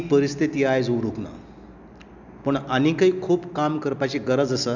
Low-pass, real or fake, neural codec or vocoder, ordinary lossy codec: 7.2 kHz; real; none; none